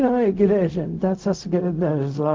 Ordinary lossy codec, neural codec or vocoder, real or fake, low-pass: Opus, 32 kbps; codec, 16 kHz, 0.4 kbps, LongCat-Audio-Codec; fake; 7.2 kHz